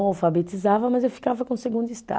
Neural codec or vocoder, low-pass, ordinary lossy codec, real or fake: none; none; none; real